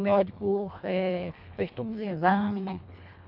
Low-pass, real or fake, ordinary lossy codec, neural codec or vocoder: 5.4 kHz; fake; none; codec, 24 kHz, 1.5 kbps, HILCodec